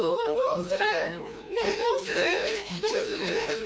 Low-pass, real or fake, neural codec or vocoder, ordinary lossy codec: none; fake; codec, 16 kHz, 1 kbps, FreqCodec, larger model; none